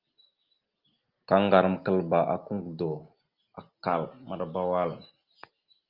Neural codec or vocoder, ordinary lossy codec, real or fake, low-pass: none; Opus, 32 kbps; real; 5.4 kHz